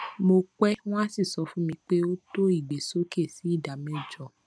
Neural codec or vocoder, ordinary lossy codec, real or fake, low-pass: none; none; real; none